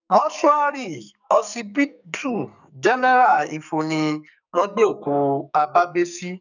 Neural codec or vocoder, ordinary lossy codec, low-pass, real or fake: codec, 44.1 kHz, 2.6 kbps, SNAC; none; 7.2 kHz; fake